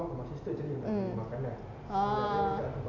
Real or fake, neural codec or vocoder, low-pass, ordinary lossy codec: real; none; 7.2 kHz; none